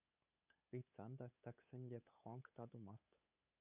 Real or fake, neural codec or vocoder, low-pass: fake; codec, 16 kHz, 8 kbps, FunCodec, trained on LibriTTS, 25 frames a second; 3.6 kHz